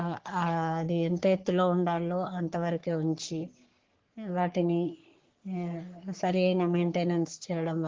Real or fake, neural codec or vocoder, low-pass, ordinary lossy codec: fake; codec, 44.1 kHz, 3.4 kbps, Pupu-Codec; 7.2 kHz; Opus, 16 kbps